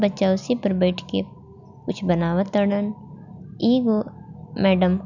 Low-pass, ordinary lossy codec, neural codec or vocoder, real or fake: 7.2 kHz; none; none; real